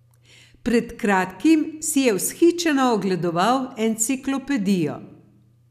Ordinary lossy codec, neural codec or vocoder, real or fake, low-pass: none; none; real; 14.4 kHz